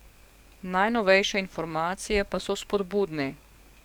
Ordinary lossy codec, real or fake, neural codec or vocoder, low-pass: none; fake; codec, 44.1 kHz, 7.8 kbps, DAC; 19.8 kHz